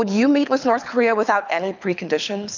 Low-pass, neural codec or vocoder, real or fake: 7.2 kHz; codec, 24 kHz, 6 kbps, HILCodec; fake